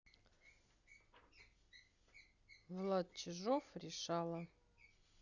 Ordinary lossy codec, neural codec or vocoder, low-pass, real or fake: none; none; 7.2 kHz; real